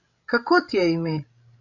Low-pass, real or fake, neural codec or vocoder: 7.2 kHz; fake; codec, 16 kHz, 16 kbps, FreqCodec, larger model